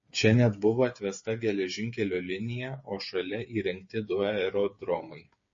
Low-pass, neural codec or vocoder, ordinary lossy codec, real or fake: 7.2 kHz; codec, 16 kHz, 8 kbps, FreqCodec, smaller model; MP3, 32 kbps; fake